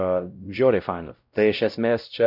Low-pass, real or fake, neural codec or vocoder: 5.4 kHz; fake; codec, 16 kHz, 0.5 kbps, X-Codec, WavLM features, trained on Multilingual LibriSpeech